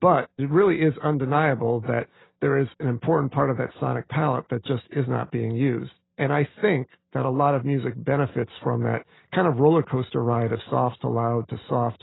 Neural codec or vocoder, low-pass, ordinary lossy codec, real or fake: none; 7.2 kHz; AAC, 16 kbps; real